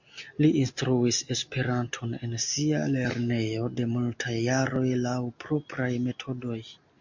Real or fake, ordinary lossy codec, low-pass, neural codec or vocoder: real; MP3, 48 kbps; 7.2 kHz; none